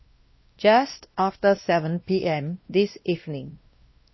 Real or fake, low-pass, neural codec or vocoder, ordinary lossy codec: fake; 7.2 kHz; codec, 16 kHz, 1 kbps, X-Codec, WavLM features, trained on Multilingual LibriSpeech; MP3, 24 kbps